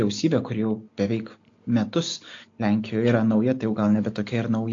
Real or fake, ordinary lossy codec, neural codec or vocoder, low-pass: real; AAC, 48 kbps; none; 7.2 kHz